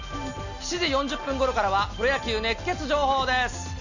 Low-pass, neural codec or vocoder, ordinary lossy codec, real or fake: 7.2 kHz; none; none; real